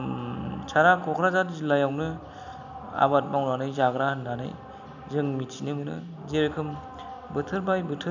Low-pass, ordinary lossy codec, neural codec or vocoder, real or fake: 7.2 kHz; none; none; real